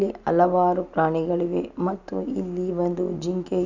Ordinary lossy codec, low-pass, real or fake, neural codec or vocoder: none; 7.2 kHz; real; none